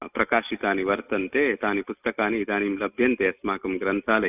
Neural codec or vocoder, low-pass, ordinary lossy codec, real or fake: none; 3.6 kHz; none; real